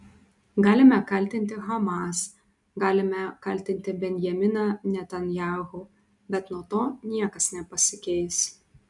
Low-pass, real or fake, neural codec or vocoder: 10.8 kHz; real; none